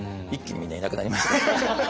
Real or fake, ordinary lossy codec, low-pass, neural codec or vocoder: real; none; none; none